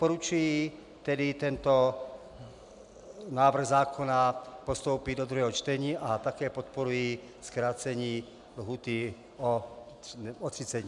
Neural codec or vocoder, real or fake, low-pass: none; real; 10.8 kHz